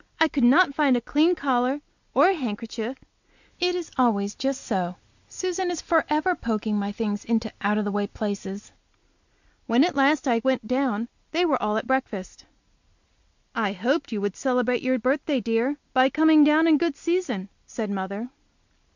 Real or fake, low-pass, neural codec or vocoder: real; 7.2 kHz; none